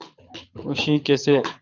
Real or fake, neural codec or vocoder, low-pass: fake; codec, 24 kHz, 6 kbps, HILCodec; 7.2 kHz